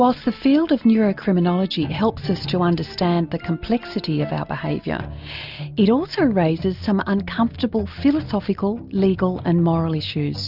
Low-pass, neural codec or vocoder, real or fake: 5.4 kHz; none; real